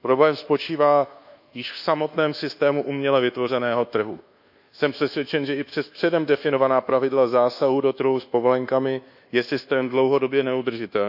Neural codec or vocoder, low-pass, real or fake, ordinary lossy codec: codec, 24 kHz, 1.2 kbps, DualCodec; 5.4 kHz; fake; none